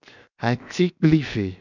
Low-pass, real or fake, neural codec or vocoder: 7.2 kHz; fake; codec, 16 kHz, 0.7 kbps, FocalCodec